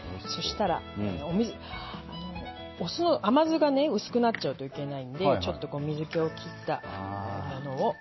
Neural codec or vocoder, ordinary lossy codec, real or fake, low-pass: none; MP3, 24 kbps; real; 7.2 kHz